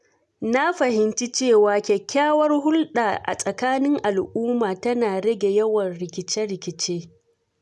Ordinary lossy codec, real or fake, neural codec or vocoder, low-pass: none; real; none; none